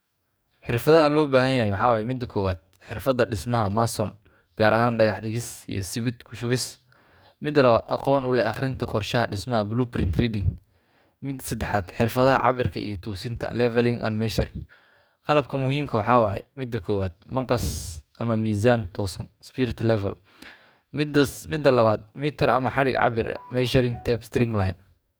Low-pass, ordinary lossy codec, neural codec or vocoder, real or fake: none; none; codec, 44.1 kHz, 2.6 kbps, DAC; fake